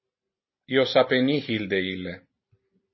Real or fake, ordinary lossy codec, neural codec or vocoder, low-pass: real; MP3, 24 kbps; none; 7.2 kHz